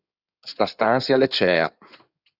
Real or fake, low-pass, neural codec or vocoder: fake; 5.4 kHz; codec, 16 kHz in and 24 kHz out, 2.2 kbps, FireRedTTS-2 codec